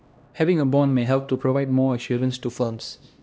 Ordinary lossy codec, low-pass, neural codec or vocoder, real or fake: none; none; codec, 16 kHz, 1 kbps, X-Codec, HuBERT features, trained on LibriSpeech; fake